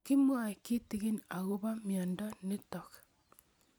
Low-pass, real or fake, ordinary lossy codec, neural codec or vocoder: none; real; none; none